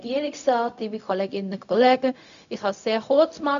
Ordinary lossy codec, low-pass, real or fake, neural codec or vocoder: none; 7.2 kHz; fake; codec, 16 kHz, 0.4 kbps, LongCat-Audio-Codec